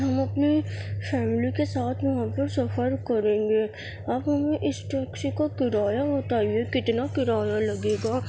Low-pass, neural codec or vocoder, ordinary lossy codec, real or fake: none; none; none; real